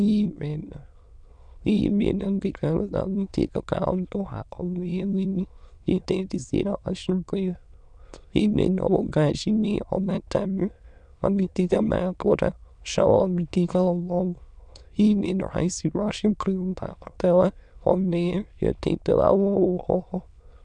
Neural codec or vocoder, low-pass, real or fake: autoencoder, 22.05 kHz, a latent of 192 numbers a frame, VITS, trained on many speakers; 9.9 kHz; fake